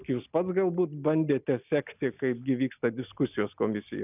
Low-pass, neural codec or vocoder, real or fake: 3.6 kHz; none; real